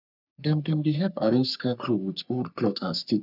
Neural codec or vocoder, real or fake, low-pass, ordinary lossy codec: codec, 44.1 kHz, 3.4 kbps, Pupu-Codec; fake; 5.4 kHz; none